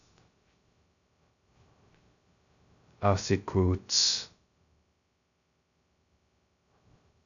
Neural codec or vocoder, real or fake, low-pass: codec, 16 kHz, 0.2 kbps, FocalCodec; fake; 7.2 kHz